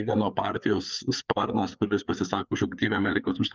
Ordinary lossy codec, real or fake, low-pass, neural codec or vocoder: Opus, 24 kbps; fake; 7.2 kHz; codec, 16 kHz, 4 kbps, FreqCodec, larger model